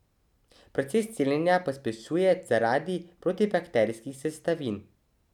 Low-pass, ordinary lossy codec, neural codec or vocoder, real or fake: 19.8 kHz; none; none; real